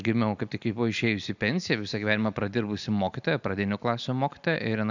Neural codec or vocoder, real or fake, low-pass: none; real; 7.2 kHz